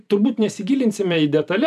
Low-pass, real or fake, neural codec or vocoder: 14.4 kHz; fake; vocoder, 44.1 kHz, 128 mel bands every 256 samples, BigVGAN v2